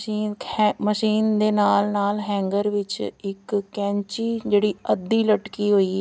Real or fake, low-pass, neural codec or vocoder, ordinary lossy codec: real; none; none; none